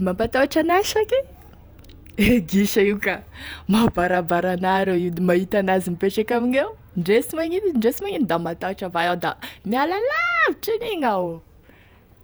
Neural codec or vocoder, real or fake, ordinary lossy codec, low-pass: vocoder, 48 kHz, 128 mel bands, Vocos; fake; none; none